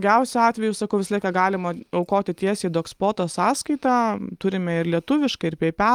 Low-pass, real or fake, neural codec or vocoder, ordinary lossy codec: 19.8 kHz; real; none; Opus, 32 kbps